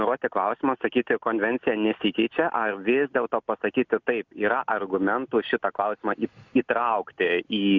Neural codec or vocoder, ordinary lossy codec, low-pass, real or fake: none; Opus, 64 kbps; 7.2 kHz; real